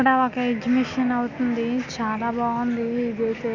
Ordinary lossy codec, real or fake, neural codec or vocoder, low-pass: none; real; none; 7.2 kHz